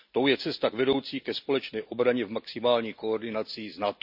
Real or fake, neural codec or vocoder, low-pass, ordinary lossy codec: real; none; 5.4 kHz; none